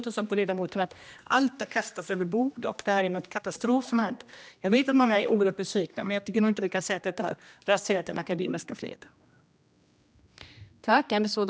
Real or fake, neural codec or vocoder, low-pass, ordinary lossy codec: fake; codec, 16 kHz, 1 kbps, X-Codec, HuBERT features, trained on general audio; none; none